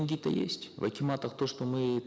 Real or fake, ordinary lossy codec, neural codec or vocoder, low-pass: real; none; none; none